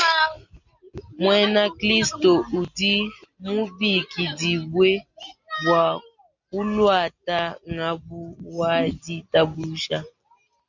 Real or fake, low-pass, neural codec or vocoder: real; 7.2 kHz; none